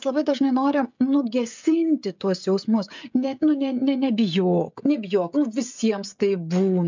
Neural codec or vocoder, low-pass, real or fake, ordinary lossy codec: codec, 16 kHz, 16 kbps, FreqCodec, smaller model; 7.2 kHz; fake; MP3, 64 kbps